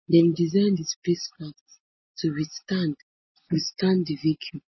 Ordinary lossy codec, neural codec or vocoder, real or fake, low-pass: MP3, 24 kbps; none; real; 7.2 kHz